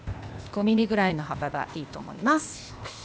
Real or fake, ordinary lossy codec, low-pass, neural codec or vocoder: fake; none; none; codec, 16 kHz, 0.8 kbps, ZipCodec